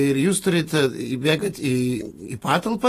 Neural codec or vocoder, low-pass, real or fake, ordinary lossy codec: vocoder, 44.1 kHz, 128 mel bands every 512 samples, BigVGAN v2; 14.4 kHz; fake; AAC, 48 kbps